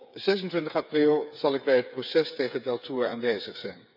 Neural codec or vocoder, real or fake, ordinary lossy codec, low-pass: codec, 16 kHz, 8 kbps, FreqCodec, smaller model; fake; none; 5.4 kHz